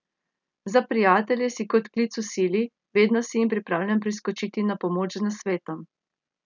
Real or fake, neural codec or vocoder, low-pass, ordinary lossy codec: real; none; 7.2 kHz; none